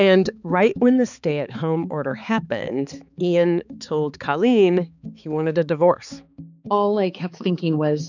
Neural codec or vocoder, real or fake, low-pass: codec, 16 kHz, 4 kbps, X-Codec, HuBERT features, trained on balanced general audio; fake; 7.2 kHz